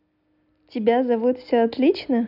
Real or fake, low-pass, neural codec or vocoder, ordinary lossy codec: real; 5.4 kHz; none; none